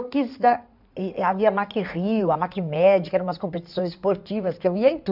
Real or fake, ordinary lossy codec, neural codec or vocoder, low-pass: fake; none; codec, 16 kHz in and 24 kHz out, 2.2 kbps, FireRedTTS-2 codec; 5.4 kHz